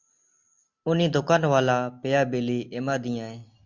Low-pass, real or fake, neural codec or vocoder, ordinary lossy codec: 7.2 kHz; real; none; Opus, 64 kbps